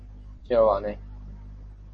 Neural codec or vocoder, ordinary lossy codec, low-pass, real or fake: none; MP3, 32 kbps; 10.8 kHz; real